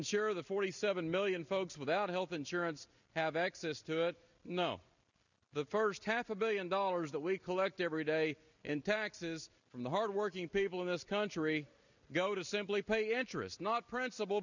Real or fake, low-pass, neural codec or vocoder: real; 7.2 kHz; none